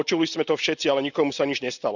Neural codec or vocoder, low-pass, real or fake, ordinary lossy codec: none; 7.2 kHz; real; none